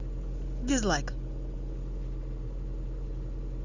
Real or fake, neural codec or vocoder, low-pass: real; none; 7.2 kHz